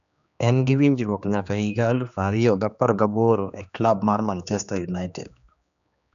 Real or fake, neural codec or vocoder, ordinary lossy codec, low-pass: fake; codec, 16 kHz, 2 kbps, X-Codec, HuBERT features, trained on general audio; none; 7.2 kHz